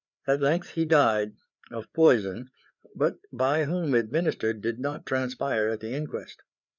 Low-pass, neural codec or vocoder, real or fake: 7.2 kHz; codec, 16 kHz, 4 kbps, FreqCodec, larger model; fake